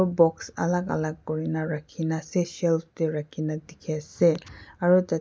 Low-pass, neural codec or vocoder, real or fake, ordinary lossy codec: none; none; real; none